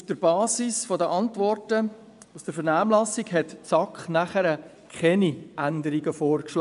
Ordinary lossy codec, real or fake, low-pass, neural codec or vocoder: none; fake; 10.8 kHz; vocoder, 24 kHz, 100 mel bands, Vocos